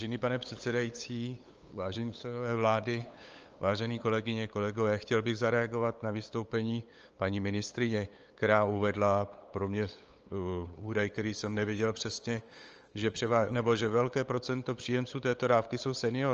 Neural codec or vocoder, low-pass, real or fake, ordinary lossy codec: codec, 16 kHz, 8 kbps, FunCodec, trained on LibriTTS, 25 frames a second; 7.2 kHz; fake; Opus, 24 kbps